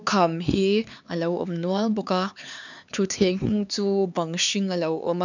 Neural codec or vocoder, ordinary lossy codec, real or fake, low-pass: codec, 16 kHz, 4 kbps, X-Codec, HuBERT features, trained on LibriSpeech; none; fake; 7.2 kHz